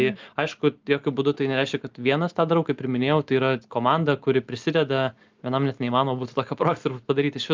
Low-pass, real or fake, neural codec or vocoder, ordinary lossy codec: 7.2 kHz; real; none; Opus, 24 kbps